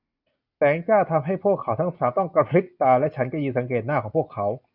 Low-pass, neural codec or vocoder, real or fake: 5.4 kHz; none; real